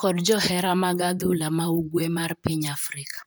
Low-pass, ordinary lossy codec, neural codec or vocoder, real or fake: none; none; vocoder, 44.1 kHz, 128 mel bands, Pupu-Vocoder; fake